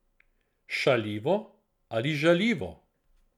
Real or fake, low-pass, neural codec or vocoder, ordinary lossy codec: real; 19.8 kHz; none; none